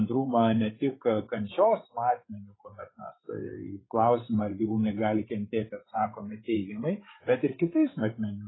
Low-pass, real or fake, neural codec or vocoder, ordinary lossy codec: 7.2 kHz; fake; codec, 16 kHz, 8 kbps, FreqCodec, larger model; AAC, 16 kbps